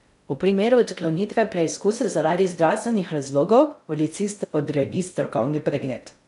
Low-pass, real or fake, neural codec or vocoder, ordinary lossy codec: 10.8 kHz; fake; codec, 16 kHz in and 24 kHz out, 0.6 kbps, FocalCodec, streaming, 2048 codes; none